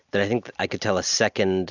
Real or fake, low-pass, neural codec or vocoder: real; 7.2 kHz; none